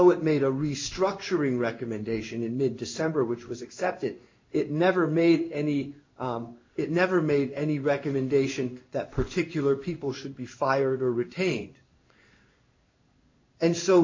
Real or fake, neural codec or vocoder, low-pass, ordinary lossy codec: fake; codec, 16 kHz in and 24 kHz out, 1 kbps, XY-Tokenizer; 7.2 kHz; MP3, 48 kbps